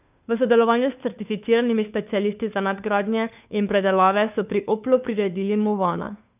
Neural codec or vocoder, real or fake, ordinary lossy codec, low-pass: codec, 16 kHz, 2 kbps, FunCodec, trained on Chinese and English, 25 frames a second; fake; none; 3.6 kHz